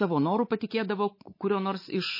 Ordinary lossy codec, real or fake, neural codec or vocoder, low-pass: MP3, 24 kbps; fake; codec, 24 kHz, 3.1 kbps, DualCodec; 5.4 kHz